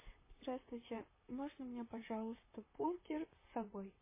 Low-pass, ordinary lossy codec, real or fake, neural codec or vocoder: 3.6 kHz; MP3, 16 kbps; fake; vocoder, 44.1 kHz, 128 mel bands, Pupu-Vocoder